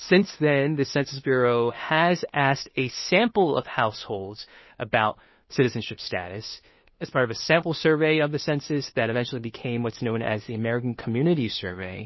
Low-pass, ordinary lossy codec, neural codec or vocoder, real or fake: 7.2 kHz; MP3, 24 kbps; codec, 16 kHz, 2 kbps, FunCodec, trained on Chinese and English, 25 frames a second; fake